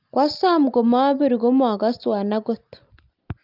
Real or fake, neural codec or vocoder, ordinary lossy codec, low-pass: real; none; Opus, 24 kbps; 5.4 kHz